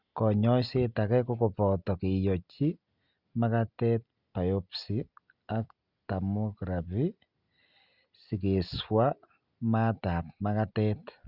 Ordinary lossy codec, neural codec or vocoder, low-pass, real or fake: Opus, 64 kbps; none; 5.4 kHz; real